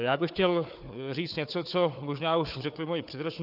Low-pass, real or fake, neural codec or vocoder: 5.4 kHz; fake; codec, 16 kHz, 4 kbps, FunCodec, trained on Chinese and English, 50 frames a second